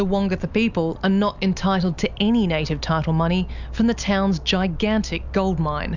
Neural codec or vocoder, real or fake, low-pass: none; real; 7.2 kHz